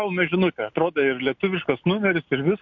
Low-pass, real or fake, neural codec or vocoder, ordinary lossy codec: 7.2 kHz; real; none; MP3, 48 kbps